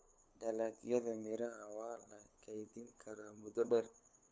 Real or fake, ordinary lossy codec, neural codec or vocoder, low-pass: fake; none; codec, 16 kHz, 8 kbps, FunCodec, trained on LibriTTS, 25 frames a second; none